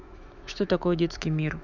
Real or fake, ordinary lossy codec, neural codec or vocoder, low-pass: fake; none; autoencoder, 48 kHz, 128 numbers a frame, DAC-VAE, trained on Japanese speech; 7.2 kHz